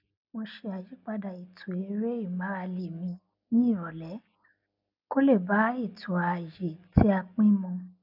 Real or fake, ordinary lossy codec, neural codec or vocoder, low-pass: real; none; none; 5.4 kHz